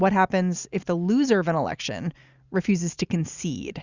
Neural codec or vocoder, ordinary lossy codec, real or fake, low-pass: none; Opus, 64 kbps; real; 7.2 kHz